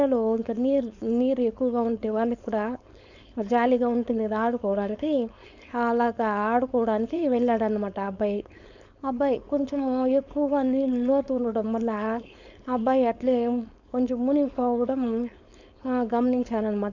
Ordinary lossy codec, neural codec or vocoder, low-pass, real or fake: none; codec, 16 kHz, 4.8 kbps, FACodec; 7.2 kHz; fake